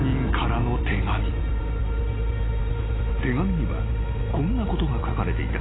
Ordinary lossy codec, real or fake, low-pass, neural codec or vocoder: AAC, 16 kbps; real; 7.2 kHz; none